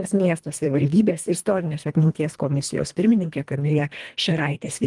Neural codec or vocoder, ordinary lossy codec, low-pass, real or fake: codec, 24 kHz, 1.5 kbps, HILCodec; Opus, 32 kbps; 10.8 kHz; fake